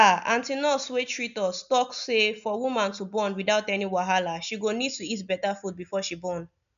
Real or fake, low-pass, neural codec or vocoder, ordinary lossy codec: real; 7.2 kHz; none; none